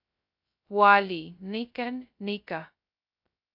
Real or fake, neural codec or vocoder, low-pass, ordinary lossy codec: fake; codec, 16 kHz, 0.2 kbps, FocalCodec; 5.4 kHz; AAC, 48 kbps